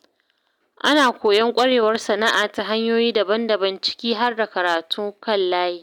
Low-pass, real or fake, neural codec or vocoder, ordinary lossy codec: 19.8 kHz; real; none; none